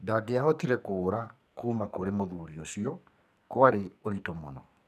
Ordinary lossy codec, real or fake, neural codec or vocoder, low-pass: none; fake; codec, 44.1 kHz, 2.6 kbps, SNAC; 14.4 kHz